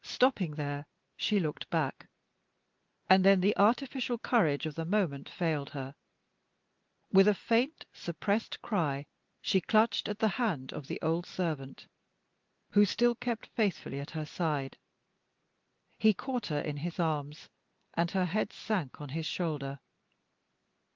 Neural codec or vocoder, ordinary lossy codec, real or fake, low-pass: none; Opus, 32 kbps; real; 7.2 kHz